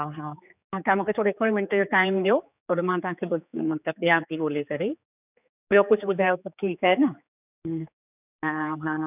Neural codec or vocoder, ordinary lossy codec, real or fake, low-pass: codec, 16 kHz, 4 kbps, X-Codec, HuBERT features, trained on general audio; none; fake; 3.6 kHz